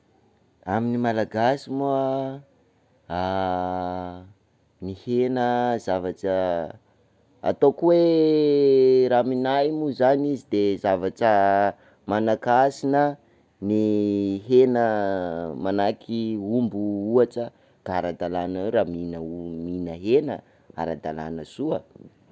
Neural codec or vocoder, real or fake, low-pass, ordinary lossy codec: none; real; none; none